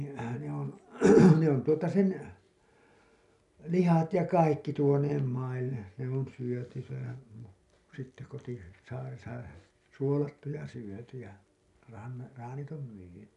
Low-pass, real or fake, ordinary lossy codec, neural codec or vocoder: 10.8 kHz; real; none; none